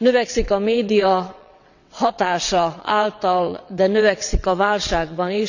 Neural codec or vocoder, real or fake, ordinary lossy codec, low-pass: vocoder, 22.05 kHz, 80 mel bands, WaveNeXt; fake; none; 7.2 kHz